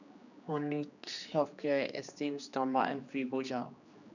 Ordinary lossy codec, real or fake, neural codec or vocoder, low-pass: none; fake; codec, 16 kHz, 2 kbps, X-Codec, HuBERT features, trained on general audio; 7.2 kHz